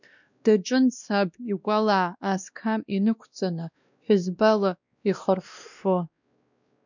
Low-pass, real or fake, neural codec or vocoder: 7.2 kHz; fake; codec, 16 kHz, 1 kbps, X-Codec, WavLM features, trained on Multilingual LibriSpeech